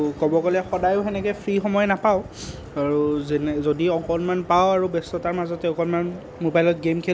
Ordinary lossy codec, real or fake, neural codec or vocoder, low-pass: none; real; none; none